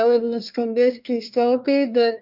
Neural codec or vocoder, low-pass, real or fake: codec, 24 kHz, 1 kbps, SNAC; 5.4 kHz; fake